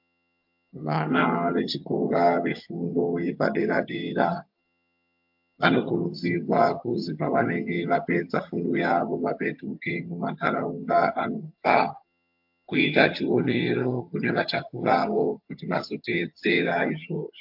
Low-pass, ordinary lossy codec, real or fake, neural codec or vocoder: 5.4 kHz; MP3, 48 kbps; fake; vocoder, 22.05 kHz, 80 mel bands, HiFi-GAN